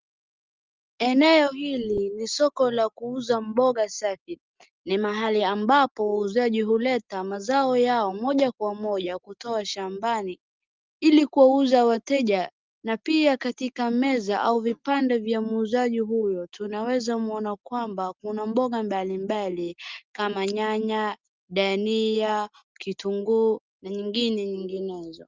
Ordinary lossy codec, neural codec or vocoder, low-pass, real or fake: Opus, 24 kbps; none; 7.2 kHz; real